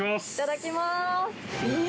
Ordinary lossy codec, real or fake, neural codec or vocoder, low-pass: none; real; none; none